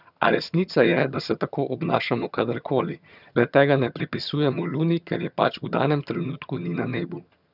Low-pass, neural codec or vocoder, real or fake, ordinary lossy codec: 5.4 kHz; vocoder, 22.05 kHz, 80 mel bands, HiFi-GAN; fake; none